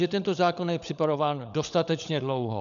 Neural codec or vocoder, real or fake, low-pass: codec, 16 kHz, 16 kbps, FunCodec, trained on LibriTTS, 50 frames a second; fake; 7.2 kHz